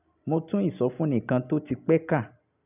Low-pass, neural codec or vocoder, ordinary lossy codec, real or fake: 3.6 kHz; none; none; real